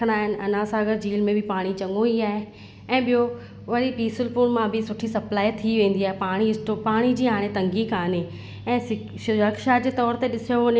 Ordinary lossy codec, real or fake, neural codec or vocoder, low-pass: none; real; none; none